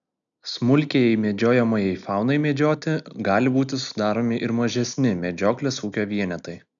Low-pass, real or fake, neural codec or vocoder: 7.2 kHz; real; none